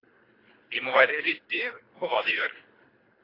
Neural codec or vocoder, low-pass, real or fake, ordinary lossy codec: codec, 24 kHz, 3 kbps, HILCodec; 5.4 kHz; fake; AAC, 24 kbps